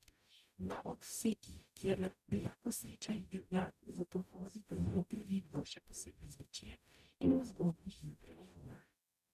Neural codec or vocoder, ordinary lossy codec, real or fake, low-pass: codec, 44.1 kHz, 0.9 kbps, DAC; none; fake; 14.4 kHz